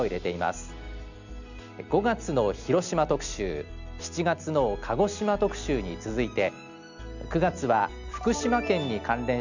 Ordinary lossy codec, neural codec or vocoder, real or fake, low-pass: none; none; real; 7.2 kHz